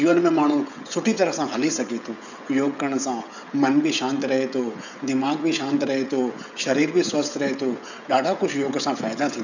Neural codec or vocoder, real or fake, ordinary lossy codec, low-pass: codec, 16 kHz, 16 kbps, FreqCodec, smaller model; fake; none; 7.2 kHz